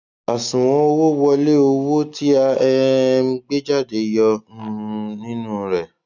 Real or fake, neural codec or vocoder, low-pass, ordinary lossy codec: real; none; 7.2 kHz; none